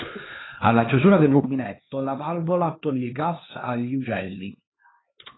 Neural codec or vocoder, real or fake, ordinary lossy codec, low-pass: codec, 16 kHz, 2 kbps, X-Codec, HuBERT features, trained on LibriSpeech; fake; AAC, 16 kbps; 7.2 kHz